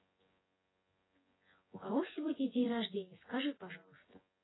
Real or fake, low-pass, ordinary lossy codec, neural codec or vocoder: fake; 7.2 kHz; AAC, 16 kbps; vocoder, 24 kHz, 100 mel bands, Vocos